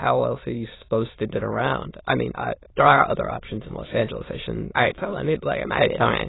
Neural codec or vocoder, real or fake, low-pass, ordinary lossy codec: autoencoder, 22.05 kHz, a latent of 192 numbers a frame, VITS, trained on many speakers; fake; 7.2 kHz; AAC, 16 kbps